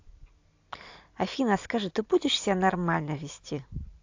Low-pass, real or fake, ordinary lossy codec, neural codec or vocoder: 7.2 kHz; real; AAC, 48 kbps; none